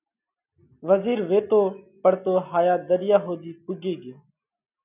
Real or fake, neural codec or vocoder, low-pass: real; none; 3.6 kHz